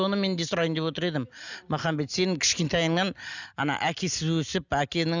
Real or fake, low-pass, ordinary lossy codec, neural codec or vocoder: real; 7.2 kHz; none; none